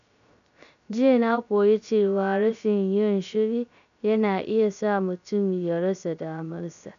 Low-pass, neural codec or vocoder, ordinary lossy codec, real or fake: 7.2 kHz; codec, 16 kHz, 0.3 kbps, FocalCodec; none; fake